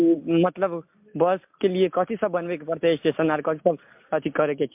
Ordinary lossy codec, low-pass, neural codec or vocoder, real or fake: MP3, 32 kbps; 3.6 kHz; none; real